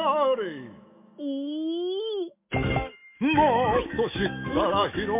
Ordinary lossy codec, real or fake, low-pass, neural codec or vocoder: MP3, 32 kbps; fake; 3.6 kHz; autoencoder, 48 kHz, 128 numbers a frame, DAC-VAE, trained on Japanese speech